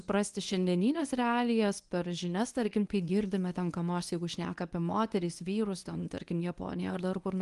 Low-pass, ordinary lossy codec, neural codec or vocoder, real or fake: 10.8 kHz; Opus, 24 kbps; codec, 24 kHz, 0.9 kbps, WavTokenizer, medium speech release version 1; fake